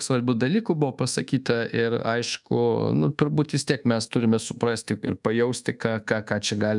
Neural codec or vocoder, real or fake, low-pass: codec, 24 kHz, 1.2 kbps, DualCodec; fake; 10.8 kHz